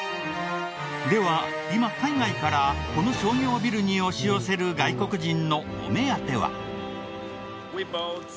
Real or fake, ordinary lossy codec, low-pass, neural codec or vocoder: real; none; none; none